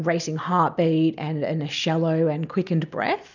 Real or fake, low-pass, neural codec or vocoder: real; 7.2 kHz; none